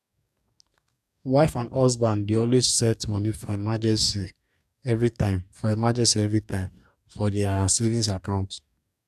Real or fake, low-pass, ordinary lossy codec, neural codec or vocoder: fake; 14.4 kHz; none; codec, 44.1 kHz, 2.6 kbps, DAC